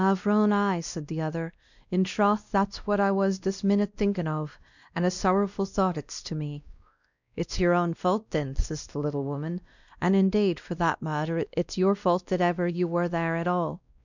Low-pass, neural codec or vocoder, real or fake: 7.2 kHz; codec, 16 kHz, 1 kbps, X-Codec, WavLM features, trained on Multilingual LibriSpeech; fake